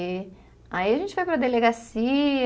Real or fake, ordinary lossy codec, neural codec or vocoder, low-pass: real; none; none; none